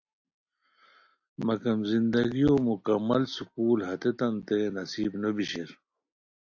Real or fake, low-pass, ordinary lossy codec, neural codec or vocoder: real; 7.2 kHz; AAC, 48 kbps; none